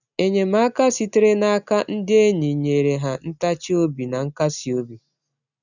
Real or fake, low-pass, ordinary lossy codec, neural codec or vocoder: real; 7.2 kHz; none; none